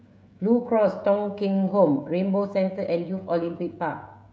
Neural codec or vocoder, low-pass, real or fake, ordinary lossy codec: codec, 16 kHz, 16 kbps, FreqCodec, smaller model; none; fake; none